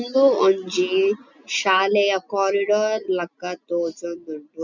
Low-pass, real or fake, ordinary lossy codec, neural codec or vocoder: 7.2 kHz; real; none; none